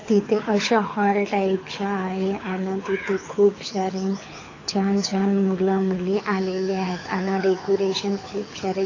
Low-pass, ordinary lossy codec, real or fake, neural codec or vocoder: 7.2 kHz; AAC, 32 kbps; fake; codec, 24 kHz, 6 kbps, HILCodec